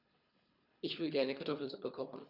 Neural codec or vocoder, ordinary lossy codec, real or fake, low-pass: codec, 24 kHz, 3 kbps, HILCodec; none; fake; 5.4 kHz